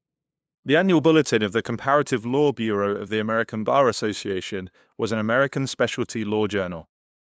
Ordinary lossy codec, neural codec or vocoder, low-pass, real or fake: none; codec, 16 kHz, 2 kbps, FunCodec, trained on LibriTTS, 25 frames a second; none; fake